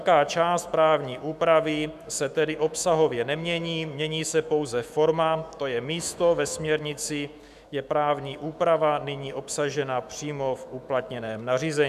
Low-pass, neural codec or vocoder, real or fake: 14.4 kHz; autoencoder, 48 kHz, 128 numbers a frame, DAC-VAE, trained on Japanese speech; fake